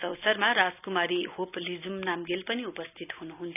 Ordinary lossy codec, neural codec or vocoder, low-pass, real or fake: none; none; 3.6 kHz; real